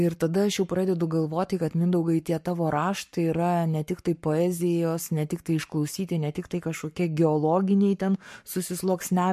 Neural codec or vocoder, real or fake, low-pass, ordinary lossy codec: codec, 44.1 kHz, 7.8 kbps, Pupu-Codec; fake; 14.4 kHz; MP3, 64 kbps